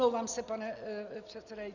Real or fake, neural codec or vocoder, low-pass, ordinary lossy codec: real; none; 7.2 kHz; Opus, 64 kbps